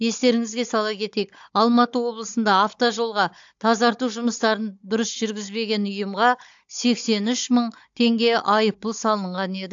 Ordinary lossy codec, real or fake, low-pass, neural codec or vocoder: AAC, 64 kbps; fake; 7.2 kHz; codec, 16 kHz, 16 kbps, FunCodec, trained on Chinese and English, 50 frames a second